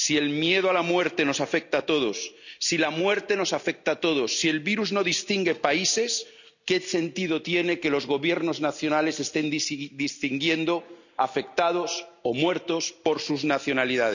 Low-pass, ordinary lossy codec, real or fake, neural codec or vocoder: 7.2 kHz; none; real; none